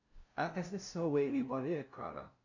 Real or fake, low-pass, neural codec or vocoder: fake; 7.2 kHz; codec, 16 kHz, 0.5 kbps, FunCodec, trained on LibriTTS, 25 frames a second